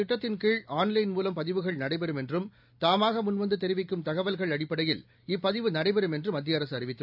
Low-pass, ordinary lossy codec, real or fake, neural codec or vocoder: 5.4 kHz; AAC, 48 kbps; real; none